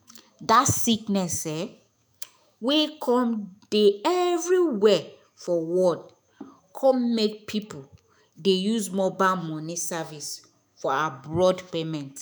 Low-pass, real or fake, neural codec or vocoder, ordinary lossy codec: none; fake; autoencoder, 48 kHz, 128 numbers a frame, DAC-VAE, trained on Japanese speech; none